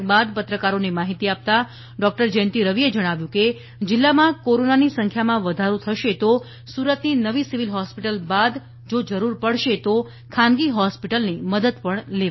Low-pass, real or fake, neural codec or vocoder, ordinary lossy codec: 7.2 kHz; real; none; MP3, 24 kbps